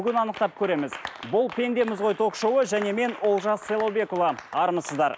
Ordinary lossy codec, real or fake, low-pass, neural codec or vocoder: none; real; none; none